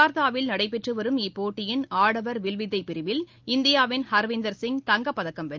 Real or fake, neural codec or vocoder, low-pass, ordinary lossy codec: real; none; 7.2 kHz; Opus, 32 kbps